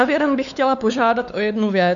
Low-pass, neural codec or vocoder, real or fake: 7.2 kHz; codec, 16 kHz, 4 kbps, X-Codec, WavLM features, trained on Multilingual LibriSpeech; fake